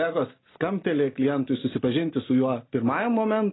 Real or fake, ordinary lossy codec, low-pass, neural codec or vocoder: real; AAC, 16 kbps; 7.2 kHz; none